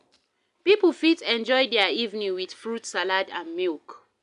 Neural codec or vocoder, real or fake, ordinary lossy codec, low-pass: none; real; none; 10.8 kHz